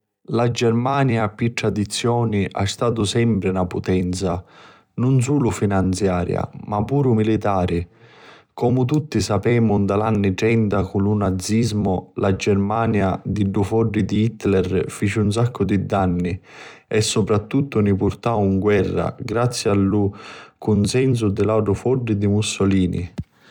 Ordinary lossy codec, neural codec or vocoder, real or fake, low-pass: none; vocoder, 44.1 kHz, 128 mel bands every 256 samples, BigVGAN v2; fake; 19.8 kHz